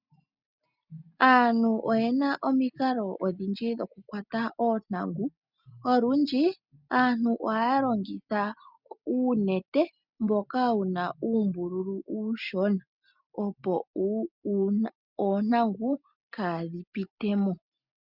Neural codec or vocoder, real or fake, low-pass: none; real; 5.4 kHz